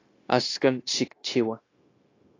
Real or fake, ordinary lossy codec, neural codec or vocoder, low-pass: fake; AAC, 32 kbps; codec, 16 kHz, 0.9 kbps, LongCat-Audio-Codec; 7.2 kHz